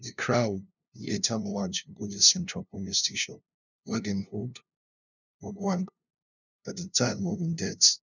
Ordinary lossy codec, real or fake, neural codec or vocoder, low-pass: none; fake; codec, 16 kHz, 0.5 kbps, FunCodec, trained on LibriTTS, 25 frames a second; 7.2 kHz